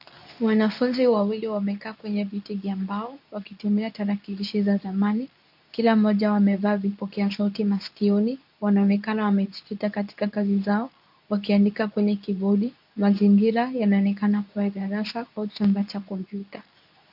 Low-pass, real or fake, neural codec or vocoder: 5.4 kHz; fake; codec, 24 kHz, 0.9 kbps, WavTokenizer, medium speech release version 2